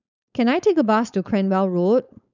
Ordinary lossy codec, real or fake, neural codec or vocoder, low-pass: none; fake; codec, 16 kHz, 4.8 kbps, FACodec; 7.2 kHz